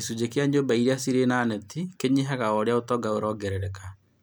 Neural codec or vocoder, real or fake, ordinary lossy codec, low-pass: none; real; none; none